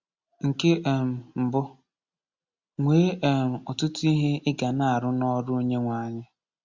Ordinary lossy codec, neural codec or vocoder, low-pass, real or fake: Opus, 64 kbps; none; 7.2 kHz; real